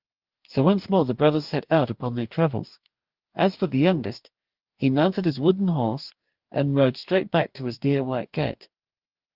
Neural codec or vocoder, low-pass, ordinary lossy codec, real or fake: codec, 44.1 kHz, 2.6 kbps, DAC; 5.4 kHz; Opus, 32 kbps; fake